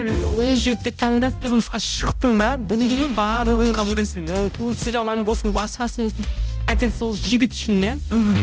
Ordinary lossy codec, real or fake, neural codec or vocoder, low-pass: none; fake; codec, 16 kHz, 0.5 kbps, X-Codec, HuBERT features, trained on balanced general audio; none